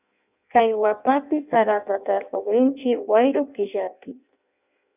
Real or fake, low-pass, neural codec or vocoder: fake; 3.6 kHz; codec, 16 kHz in and 24 kHz out, 0.6 kbps, FireRedTTS-2 codec